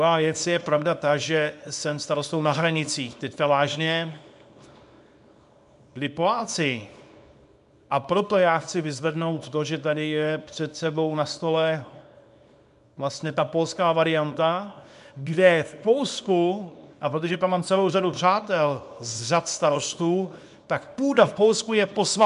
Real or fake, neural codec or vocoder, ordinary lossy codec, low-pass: fake; codec, 24 kHz, 0.9 kbps, WavTokenizer, small release; MP3, 96 kbps; 10.8 kHz